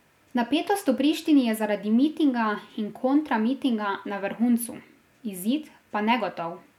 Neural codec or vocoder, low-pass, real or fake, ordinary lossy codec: none; 19.8 kHz; real; none